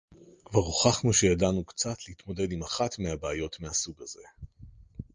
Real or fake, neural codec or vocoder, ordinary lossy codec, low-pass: real; none; Opus, 24 kbps; 7.2 kHz